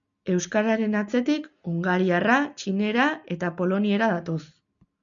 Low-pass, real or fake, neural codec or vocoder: 7.2 kHz; real; none